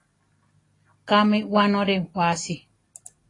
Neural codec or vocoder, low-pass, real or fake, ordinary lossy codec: none; 10.8 kHz; real; AAC, 32 kbps